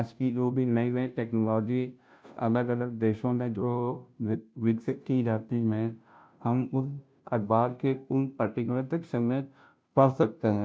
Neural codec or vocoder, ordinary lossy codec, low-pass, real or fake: codec, 16 kHz, 0.5 kbps, FunCodec, trained on Chinese and English, 25 frames a second; none; none; fake